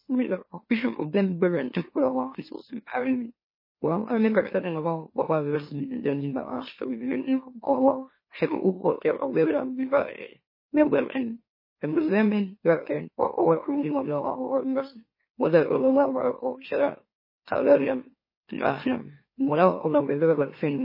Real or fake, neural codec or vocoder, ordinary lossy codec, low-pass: fake; autoencoder, 44.1 kHz, a latent of 192 numbers a frame, MeloTTS; MP3, 24 kbps; 5.4 kHz